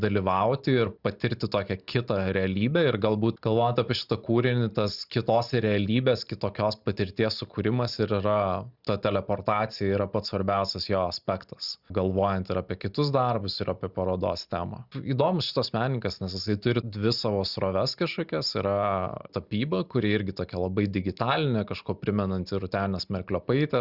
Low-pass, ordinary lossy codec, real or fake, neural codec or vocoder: 5.4 kHz; Opus, 64 kbps; real; none